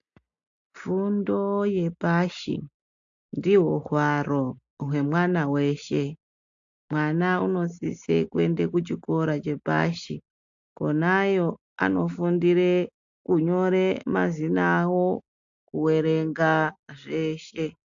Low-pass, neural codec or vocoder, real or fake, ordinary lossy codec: 7.2 kHz; none; real; AAC, 64 kbps